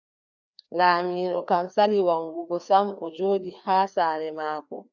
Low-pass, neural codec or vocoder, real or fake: 7.2 kHz; codec, 16 kHz, 2 kbps, FreqCodec, larger model; fake